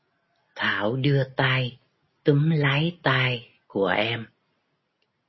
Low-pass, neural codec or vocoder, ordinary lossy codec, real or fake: 7.2 kHz; none; MP3, 24 kbps; real